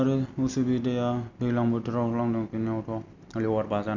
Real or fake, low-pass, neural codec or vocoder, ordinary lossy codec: real; 7.2 kHz; none; none